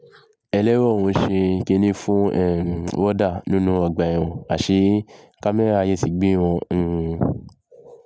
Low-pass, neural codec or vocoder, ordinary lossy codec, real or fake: none; none; none; real